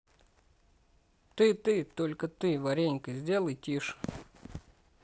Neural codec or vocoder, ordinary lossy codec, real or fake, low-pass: none; none; real; none